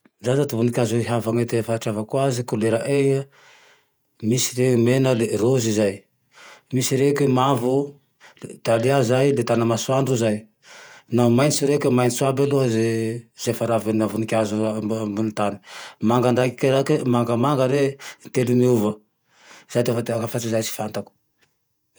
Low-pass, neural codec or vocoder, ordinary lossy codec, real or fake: none; vocoder, 48 kHz, 128 mel bands, Vocos; none; fake